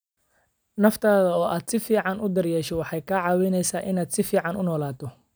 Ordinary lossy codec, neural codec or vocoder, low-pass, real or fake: none; none; none; real